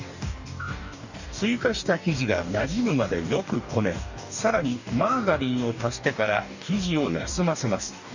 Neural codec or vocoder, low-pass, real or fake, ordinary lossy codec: codec, 44.1 kHz, 2.6 kbps, DAC; 7.2 kHz; fake; none